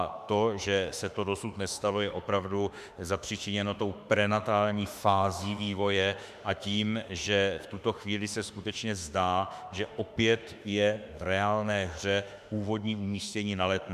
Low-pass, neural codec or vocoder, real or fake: 14.4 kHz; autoencoder, 48 kHz, 32 numbers a frame, DAC-VAE, trained on Japanese speech; fake